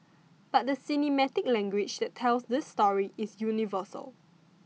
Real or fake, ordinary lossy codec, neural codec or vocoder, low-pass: real; none; none; none